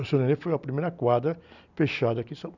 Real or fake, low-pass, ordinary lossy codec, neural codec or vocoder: real; 7.2 kHz; none; none